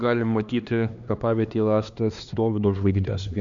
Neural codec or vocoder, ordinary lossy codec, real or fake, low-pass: codec, 16 kHz, 2 kbps, X-Codec, HuBERT features, trained on LibriSpeech; Opus, 64 kbps; fake; 7.2 kHz